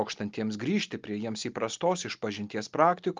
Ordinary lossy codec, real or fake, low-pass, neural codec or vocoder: Opus, 32 kbps; real; 7.2 kHz; none